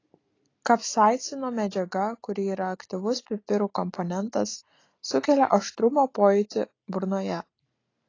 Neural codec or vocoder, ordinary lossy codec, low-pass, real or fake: none; AAC, 32 kbps; 7.2 kHz; real